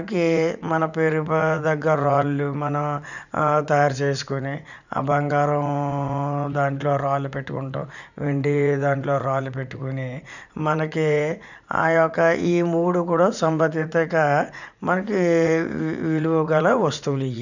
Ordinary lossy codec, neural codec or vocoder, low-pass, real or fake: none; vocoder, 44.1 kHz, 80 mel bands, Vocos; 7.2 kHz; fake